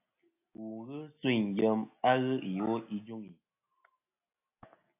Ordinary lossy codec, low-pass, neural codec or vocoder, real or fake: AAC, 24 kbps; 3.6 kHz; none; real